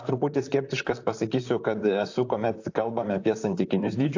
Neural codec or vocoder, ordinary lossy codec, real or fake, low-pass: vocoder, 44.1 kHz, 128 mel bands, Pupu-Vocoder; AAC, 48 kbps; fake; 7.2 kHz